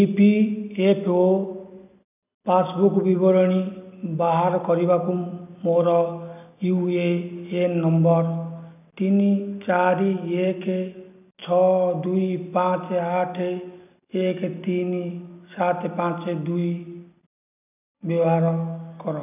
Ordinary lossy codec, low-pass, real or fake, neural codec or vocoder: none; 3.6 kHz; real; none